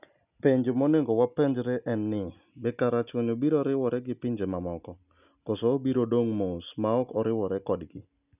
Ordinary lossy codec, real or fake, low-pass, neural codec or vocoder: none; real; 3.6 kHz; none